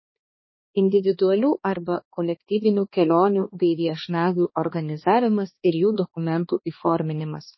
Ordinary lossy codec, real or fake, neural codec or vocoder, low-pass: MP3, 24 kbps; fake; codec, 16 kHz, 2 kbps, X-Codec, HuBERT features, trained on balanced general audio; 7.2 kHz